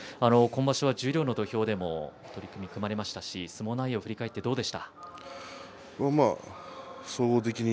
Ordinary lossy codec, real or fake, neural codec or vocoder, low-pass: none; real; none; none